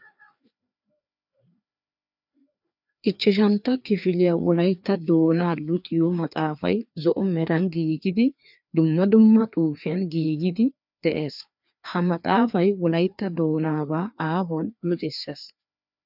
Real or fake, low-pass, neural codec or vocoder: fake; 5.4 kHz; codec, 16 kHz, 2 kbps, FreqCodec, larger model